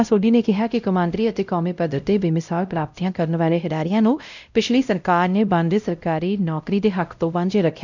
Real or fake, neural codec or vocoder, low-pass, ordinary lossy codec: fake; codec, 16 kHz, 0.5 kbps, X-Codec, WavLM features, trained on Multilingual LibriSpeech; 7.2 kHz; none